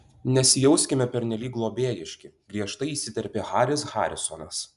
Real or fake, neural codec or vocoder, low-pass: real; none; 10.8 kHz